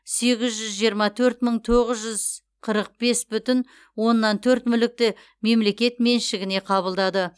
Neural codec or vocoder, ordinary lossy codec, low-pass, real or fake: none; none; none; real